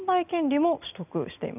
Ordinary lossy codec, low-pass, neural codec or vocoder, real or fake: none; 3.6 kHz; none; real